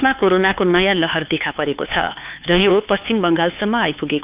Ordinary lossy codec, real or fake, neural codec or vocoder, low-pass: Opus, 24 kbps; fake; codec, 16 kHz, 4 kbps, X-Codec, HuBERT features, trained on LibriSpeech; 3.6 kHz